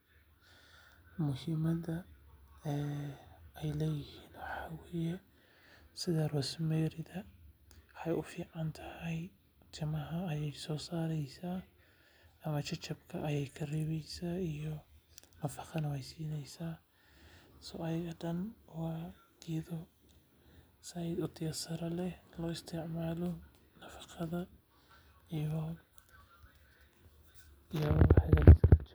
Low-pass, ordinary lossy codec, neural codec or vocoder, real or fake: none; none; none; real